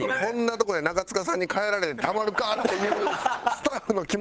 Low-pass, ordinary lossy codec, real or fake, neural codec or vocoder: none; none; fake; codec, 16 kHz, 8 kbps, FunCodec, trained on Chinese and English, 25 frames a second